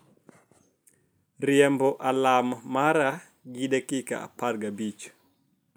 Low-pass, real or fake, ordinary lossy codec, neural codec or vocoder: none; real; none; none